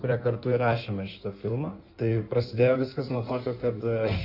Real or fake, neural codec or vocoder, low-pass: fake; codec, 16 kHz in and 24 kHz out, 1.1 kbps, FireRedTTS-2 codec; 5.4 kHz